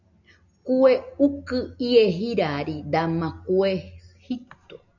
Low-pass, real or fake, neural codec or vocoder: 7.2 kHz; real; none